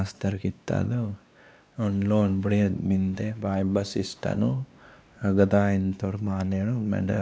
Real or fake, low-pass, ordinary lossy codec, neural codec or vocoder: fake; none; none; codec, 16 kHz, 2 kbps, X-Codec, WavLM features, trained on Multilingual LibriSpeech